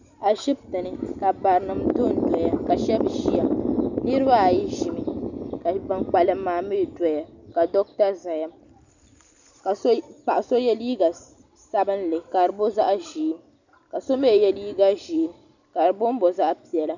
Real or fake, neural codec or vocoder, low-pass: real; none; 7.2 kHz